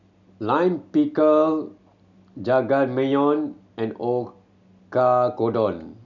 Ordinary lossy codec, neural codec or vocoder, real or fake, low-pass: none; none; real; 7.2 kHz